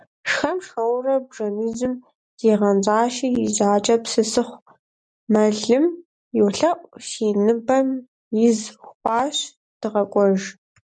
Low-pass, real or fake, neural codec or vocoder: 9.9 kHz; real; none